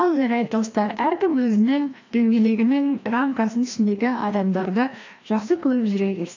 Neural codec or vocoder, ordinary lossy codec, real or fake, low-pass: codec, 16 kHz, 1 kbps, FreqCodec, larger model; AAC, 48 kbps; fake; 7.2 kHz